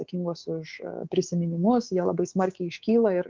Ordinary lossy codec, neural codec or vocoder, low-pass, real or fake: Opus, 32 kbps; codec, 24 kHz, 3.1 kbps, DualCodec; 7.2 kHz; fake